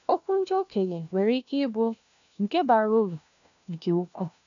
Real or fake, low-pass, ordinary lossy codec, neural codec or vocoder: fake; 7.2 kHz; none; codec, 16 kHz, 1 kbps, FunCodec, trained on LibriTTS, 50 frames a second